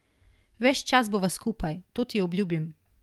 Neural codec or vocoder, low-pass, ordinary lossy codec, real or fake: codec, 44.1 kHz, 7.8 kbps, DAC; 19.8 kHz; Opus, 32 kbps; fake